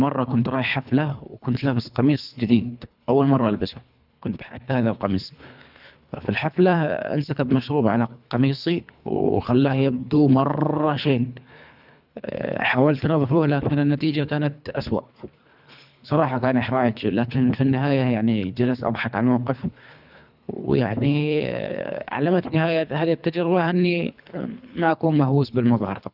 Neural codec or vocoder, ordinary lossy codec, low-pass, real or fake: codec, 24 kHz, 3 kbps, HILCodec; none; 5.4 kHz; fake